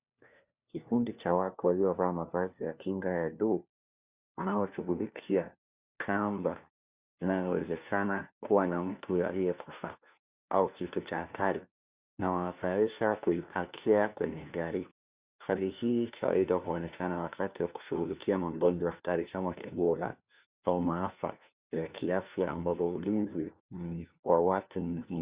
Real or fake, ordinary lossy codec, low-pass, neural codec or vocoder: fake; Opus, 64 kbps; 3.6 kHz; codec, 16 kHz, 1 kbps, FunCodec, trained on LibriTTS, 50 frames a second